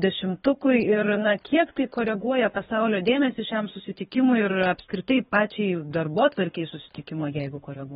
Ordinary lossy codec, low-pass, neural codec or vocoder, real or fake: AAC, 16 kbps; 10.8 kHz; codec, 24 kHz, 3 kbps, HILCodec; fake